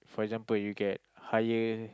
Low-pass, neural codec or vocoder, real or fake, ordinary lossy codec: none; none; real; none